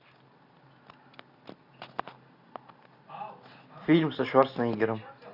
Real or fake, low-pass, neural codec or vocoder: real; 5.4 kHz; none